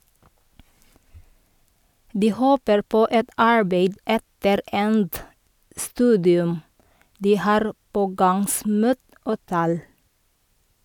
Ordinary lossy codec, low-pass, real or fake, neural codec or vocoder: none; 19.8 kHz; real; none